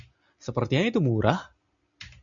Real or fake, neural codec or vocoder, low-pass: real; none; 7.2 kHz